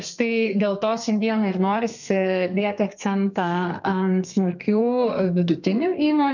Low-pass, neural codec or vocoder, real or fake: 7.2 kHz; codec, 32 kHz, 1.9 kbps, SNAC; fake